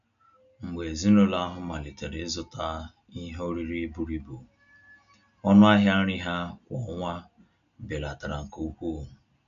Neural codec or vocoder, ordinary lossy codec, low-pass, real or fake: none; none; 7.2 kHz; real